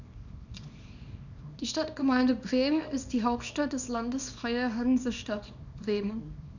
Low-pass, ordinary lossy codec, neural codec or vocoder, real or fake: 7.2 kHz; none; codec, 24 kHz, 0.9 kbps, WavTokenizer, small release; fake